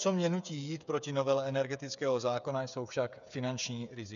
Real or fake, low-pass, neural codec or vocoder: fake; 7.2 kHz; codec, 16 kHz, 8 kbps, FreqCodec, smaller model